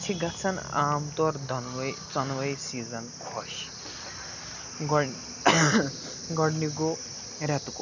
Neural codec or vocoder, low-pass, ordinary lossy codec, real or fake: none; 7.2 kHz; none; real